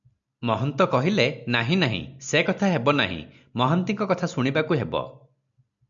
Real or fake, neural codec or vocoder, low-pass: real; none; 7.2 kHz